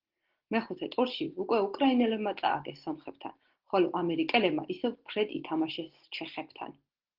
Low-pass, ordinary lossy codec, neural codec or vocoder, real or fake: 5.4 kHz; Opus, 16 kbps; none; real